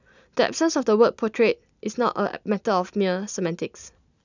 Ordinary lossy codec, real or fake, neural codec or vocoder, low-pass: none; real; none; 7.2 kHz